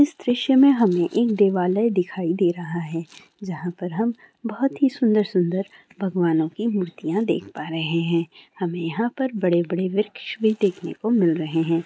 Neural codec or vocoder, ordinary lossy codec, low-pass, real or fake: none; none; none; real